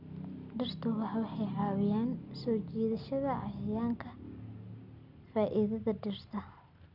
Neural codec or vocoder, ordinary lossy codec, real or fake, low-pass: none; none; real; 5.4 kHz